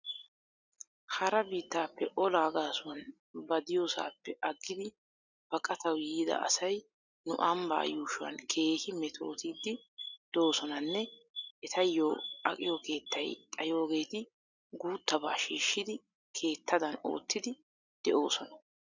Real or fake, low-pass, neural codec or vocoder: real; 7.2 kHz; none